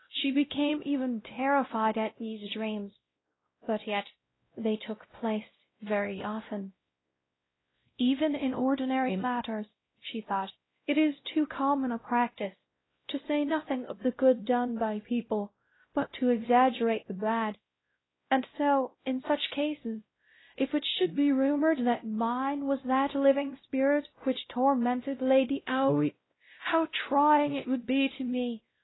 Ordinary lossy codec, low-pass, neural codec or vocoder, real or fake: AAC, 16 kbps; 7.2 kHz; codec, 16 kHz, 0.5 kbps, X-Codec, WavLM features, trained on Multilingual LibriSpeech; fake